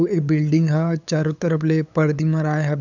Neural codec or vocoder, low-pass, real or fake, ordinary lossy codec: codec, 16 kHz, 8 kbps, FunCodec, trained on LibriTTS, 25 frames a second; 7.2 kHz; fake; none